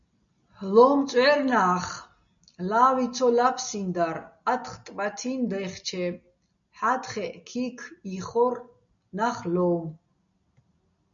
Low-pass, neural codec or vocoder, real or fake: 7.2 kHz; none; real